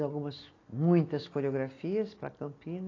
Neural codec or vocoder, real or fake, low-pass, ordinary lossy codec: none; real; 7.2 kHz; AAC, 32 kbps